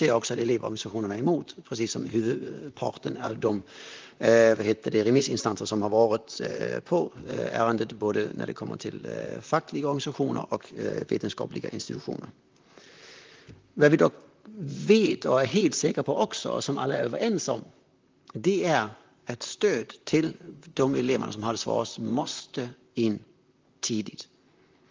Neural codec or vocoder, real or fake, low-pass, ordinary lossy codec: vocoder, 44.1 kHz, 128 mel bands, Pupu-Vocoder; fake; 7.2 kHz; Opus, 32 kbps